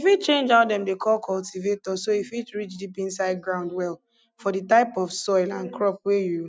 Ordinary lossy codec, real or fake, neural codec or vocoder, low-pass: none; real; none; none